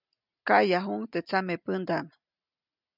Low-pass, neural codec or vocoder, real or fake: 5.4 kHz; none; real